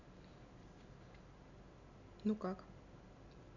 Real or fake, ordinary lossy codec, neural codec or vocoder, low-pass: real; none; none; 7.2 kHz